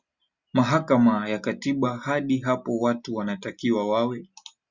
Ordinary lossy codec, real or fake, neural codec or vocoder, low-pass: Opus, 64 kbps; real; none; 7.2 kHz